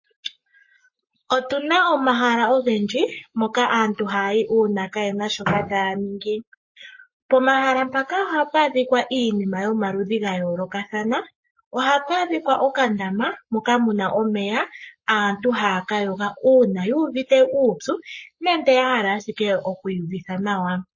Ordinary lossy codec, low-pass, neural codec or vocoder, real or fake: MP3, 32 kbps; 7.2 kHz; codec, 44.1 kHz, 7.8 kbps, Pupu-Codec; fake